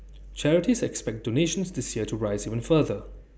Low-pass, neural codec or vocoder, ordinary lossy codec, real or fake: none; none; none; real